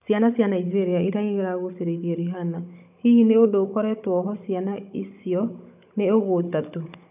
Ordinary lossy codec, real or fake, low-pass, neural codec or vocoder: none; fake; 3.6 kHz; codec, 16 kHz, 16 kbps, FunCodec, trained on Chinese and English, 50 frames a second